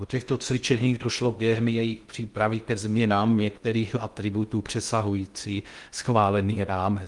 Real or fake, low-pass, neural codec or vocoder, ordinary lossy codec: fake; 10.8 kHz; codec, 16 kHz in and 24 kHz out, 0.6 kbps, FocalCodec, streaming, 2048 codes; Opus, 32 kbps